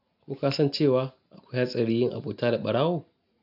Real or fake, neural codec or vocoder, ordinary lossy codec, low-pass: real; none; none; 5.4 kHz